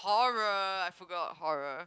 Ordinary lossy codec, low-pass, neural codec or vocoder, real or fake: none; none; none; real